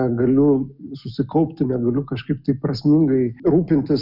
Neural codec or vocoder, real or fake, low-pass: none; real; 5.4 kHz